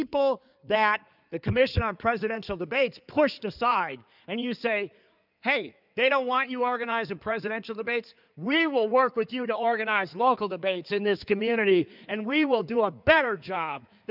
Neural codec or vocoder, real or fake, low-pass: codec, 16 kHz in and 24 kHz out, 2.2 kbps, FireRedTTS-2 codec; fake; 5.4 kHz